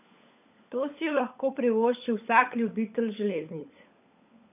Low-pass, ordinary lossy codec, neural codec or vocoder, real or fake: 3.6 kHz; AAC, 32 kbps; codec, 16 kHz, 16 kbps, FunCodec, trained on LibriTTS, 50 frames a second; fake